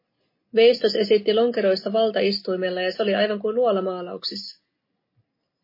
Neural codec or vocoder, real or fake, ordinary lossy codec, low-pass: none; real; MP3, 24 kbps; 5.4 kHz